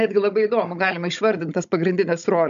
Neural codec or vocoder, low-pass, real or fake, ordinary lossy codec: codec, 16 kHz, 16 kbps, FunCodec, trained on Chinese and English, 50 frames a second; 7.2 kHz; fake; AAC, 96 kbps